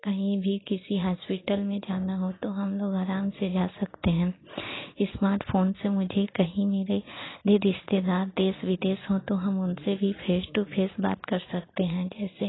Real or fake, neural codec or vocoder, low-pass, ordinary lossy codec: real; none; 7.2 kHz; AAC, 16 kbps